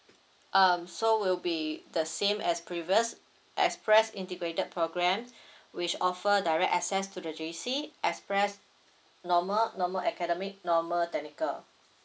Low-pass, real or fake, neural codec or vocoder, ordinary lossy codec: none; real; none; none